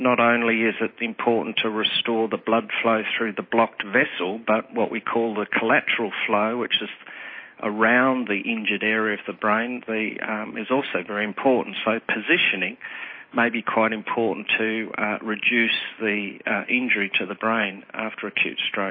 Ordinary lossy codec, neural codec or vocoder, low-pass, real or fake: MP3, 24 kbps; none; 5.4 kHz; real